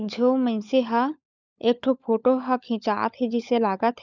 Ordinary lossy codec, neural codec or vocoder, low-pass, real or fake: none; codec, 16 kHz, 16 kbps, FunCodec, trained on LibriTTS, 50 frames a second; 7.2 kHz; fake